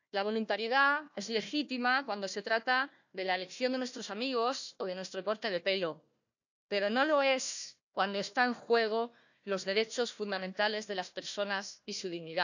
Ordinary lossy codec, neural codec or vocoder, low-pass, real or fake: none; codec, 16 kHz, 1 kbps, FunCodec, trained on Chinese and English, 50 frames a second; 7.2 kHz; fake